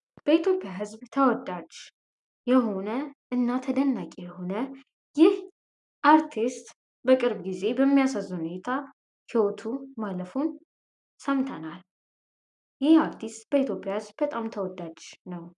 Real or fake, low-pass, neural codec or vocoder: real; 10.8 kHz; none